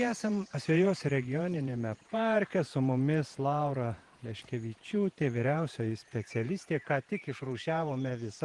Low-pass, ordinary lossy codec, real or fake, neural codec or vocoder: 10.8 kHz; Opus, 24 kbps; fake; vocoder, 48 kHz, 128 mel bands, Vocos